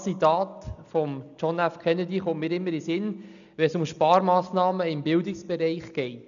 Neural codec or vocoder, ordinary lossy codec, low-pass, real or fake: none; MP3, 96 kbps; 7.2 kHz; real